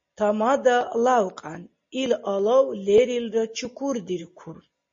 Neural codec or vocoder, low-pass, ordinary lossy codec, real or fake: none; 7.2 kHz; MP3, 32 kbps; real